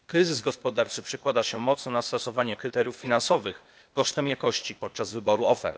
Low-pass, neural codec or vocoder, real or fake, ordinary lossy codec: none; codec, 16 kHz, 0.8 kbps, ZipCodec; fake; none